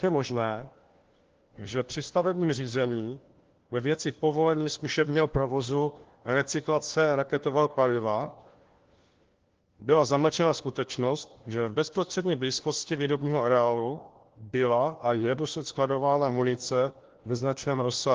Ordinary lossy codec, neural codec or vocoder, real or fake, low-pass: Opus, 16 kbps; codec, 16 kHz, 1 kbps, FunCodec, trained on LibriTTS, 50 frames a second; fake; 7.2 kHz